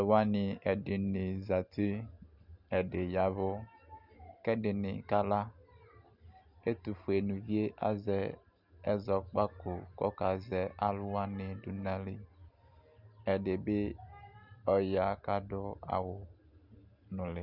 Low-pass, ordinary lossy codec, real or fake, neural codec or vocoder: 5.4 kHz; Opus, 64 kbps; real; none